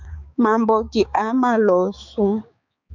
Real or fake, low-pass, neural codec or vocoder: fake; 7.2 kHz; codec, 16 kHz, 4 kbps, X-Codec, HuBERT features, trained on balanced general audio